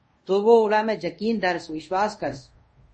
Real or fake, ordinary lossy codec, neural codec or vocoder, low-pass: fake; MP3, 32 kbps; codec, 24 kHz, 0.5 kbps, DualCodec; 9.9 kHz